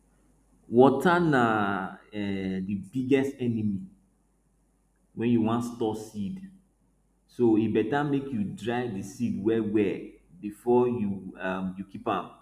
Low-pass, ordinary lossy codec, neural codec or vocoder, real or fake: 14.4 kHz; none; none; real